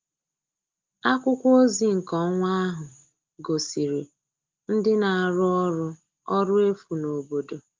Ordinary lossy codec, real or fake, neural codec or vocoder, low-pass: Opus, 32 kbps; real; none; 7.2 kHz